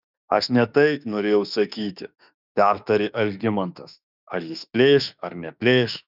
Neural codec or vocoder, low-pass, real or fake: autoencoder, 48 kHz, 32 numbers a frame, DAC-VAE, trained on Japanese speech; 5.4 kHz; fake